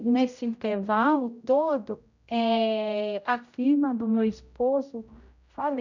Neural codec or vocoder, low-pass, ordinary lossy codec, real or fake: codec, 16 kHz, 0.5 kbps, X-Codec, HuBERT features, trained on general audio; 7.2 kHz; none; fake